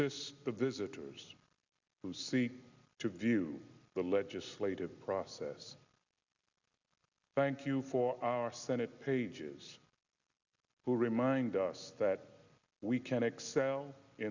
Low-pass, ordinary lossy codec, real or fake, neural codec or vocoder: 7.2 kHz; Opus, 64 kbps; real; none